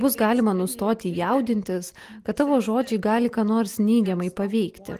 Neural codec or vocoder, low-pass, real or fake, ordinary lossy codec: none; 14.4 kHz; real; Opus, 24 kbps